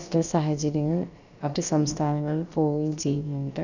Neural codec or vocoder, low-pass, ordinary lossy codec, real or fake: codec, 16 kHz, about 1 kbps, DyCAST, with the encoder's durations; 7.2 kHz; none; fake